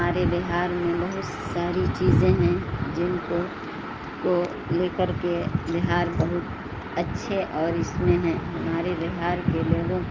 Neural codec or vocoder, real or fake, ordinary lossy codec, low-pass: none; real; Opus, 24 kbps; 7.2 kHz